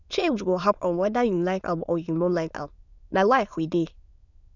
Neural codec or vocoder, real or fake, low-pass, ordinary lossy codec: autoencoder, 22.05 kHz, a latent of 192 numbers a frame, VITS, trained on many speakers; fake; 7.2 kHz; none